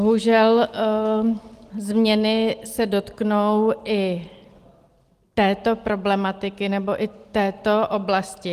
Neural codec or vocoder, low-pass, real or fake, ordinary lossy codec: none; 14.4 kHz; real; Opus, 24 kbps